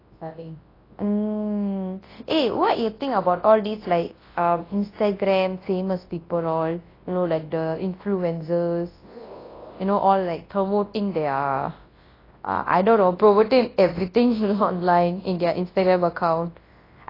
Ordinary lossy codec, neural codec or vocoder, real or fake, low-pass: AAC, 24 kbps; codec, 24 kHz, 0.9 kbps, WavTokenizer, large speech release; fake; 5.4 kHz